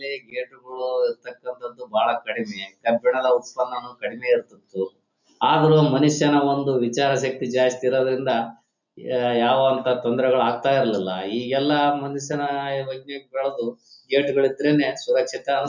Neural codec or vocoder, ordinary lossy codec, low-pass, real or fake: none; none; 7.2 kHz; real